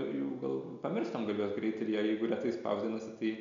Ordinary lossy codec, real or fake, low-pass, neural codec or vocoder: MP3, 64 kbps; real; 7.2 kHz; none